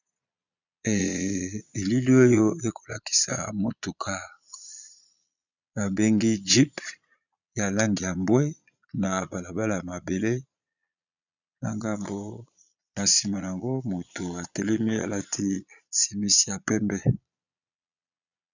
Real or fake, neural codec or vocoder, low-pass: fake; vocoder, 22.05 kHz, 80 mel bands, Vocos; 7.2 kHz